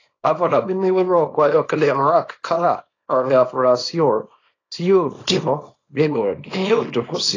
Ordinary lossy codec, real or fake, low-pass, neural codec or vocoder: AAC, 32 kbps; fake; 7.2 kHz; codec, 24 kHz, 0.9 kbps, WavTokenizer, small release